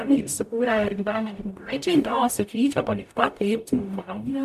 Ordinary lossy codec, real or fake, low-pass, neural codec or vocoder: AAC, 96 kbps; fake; 14.4 kHz; codec, 44.1 kHz, 0.9 kbps, DAC